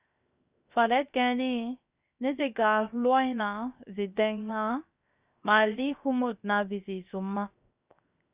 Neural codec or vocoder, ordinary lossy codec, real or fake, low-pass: codec, 16 kHz, 0.7 kbps, FocalCodec; Opus, 64 kbps; fake; 3.6 kHz